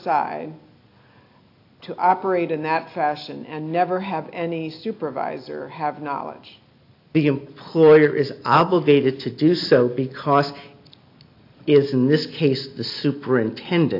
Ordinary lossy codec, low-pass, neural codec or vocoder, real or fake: AAC, 32 kbps; 5.4 kHz; none; real